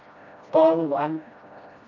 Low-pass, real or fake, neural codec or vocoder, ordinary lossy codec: 7.2 kHz; fake; codec, 16 kHz, 1 kbps, FreqCodec, smaller model; none